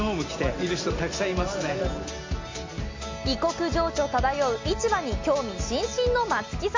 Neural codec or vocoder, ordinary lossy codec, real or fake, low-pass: none; MP3, 64 kbps; real; 7.2 kHz